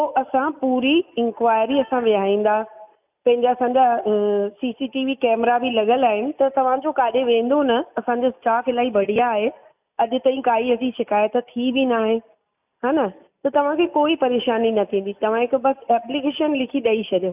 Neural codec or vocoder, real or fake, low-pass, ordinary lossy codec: none; real; 3.6 kHz; none